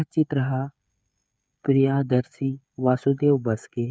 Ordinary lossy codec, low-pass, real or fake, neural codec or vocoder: none; none; fake; codec, 16 kHz, 8 kbps, FreqCodec, smaller model